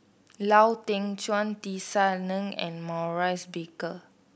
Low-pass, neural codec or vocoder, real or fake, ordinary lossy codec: none; none; real; none